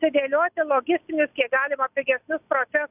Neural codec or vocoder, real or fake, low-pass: none; real; 3.6 kHz